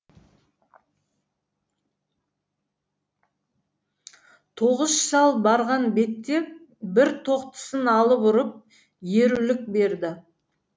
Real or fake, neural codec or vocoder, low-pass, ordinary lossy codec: real; none; none; none